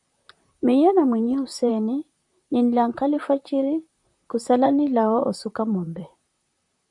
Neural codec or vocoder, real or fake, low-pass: vocoder, 44.1 kHz, 128 mel bands, Pupu-Vocoder; fake; 10.8 kHz